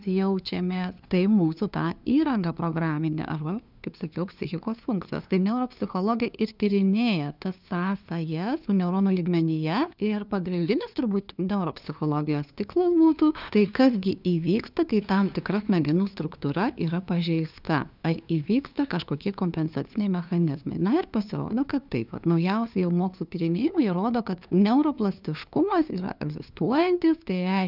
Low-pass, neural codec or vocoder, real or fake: 5.4 kHz; codec, 16 kHz, 2 kbps, FunCodec, trained on LibriTTS, 25 frames a second; fake